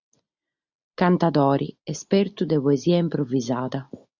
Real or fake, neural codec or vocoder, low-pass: real; none; 7.2 kHz